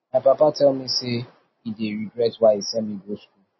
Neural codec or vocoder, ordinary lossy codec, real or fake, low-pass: none; MP3, 24 kbps; real; 7.2 kHz